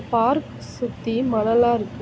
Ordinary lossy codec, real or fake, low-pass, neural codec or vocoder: none; real; none; none